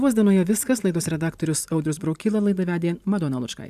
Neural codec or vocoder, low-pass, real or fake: vocoder, 44.1 kHz, 128 mel bands every 512 samples, BigVGAN v2; 14.4 kHz; fake